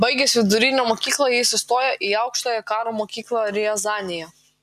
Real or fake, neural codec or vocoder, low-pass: real; none; 14.4 kHz